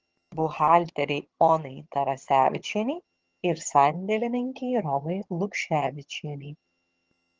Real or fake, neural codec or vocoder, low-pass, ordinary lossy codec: fake; vocoder, 22.05 kHz, 80 mel bands, HiFi-GAN; 7.2 kHz; Opus, 16 kbps